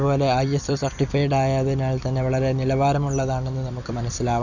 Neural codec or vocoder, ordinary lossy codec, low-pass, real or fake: vocoder, 44.1 kHz, 128 mel bands every 256 samples, BigVGAN v2; none; 7.2 kHz; fake